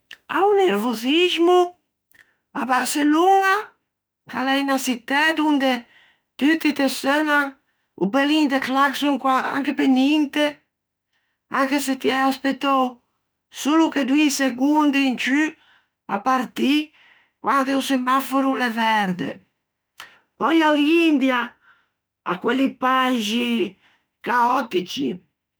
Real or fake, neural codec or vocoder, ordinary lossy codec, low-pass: fake; autoencoder, 48 kHz, 32 numbers a frame, DAC-VAE, trained on Japanese speech; none; none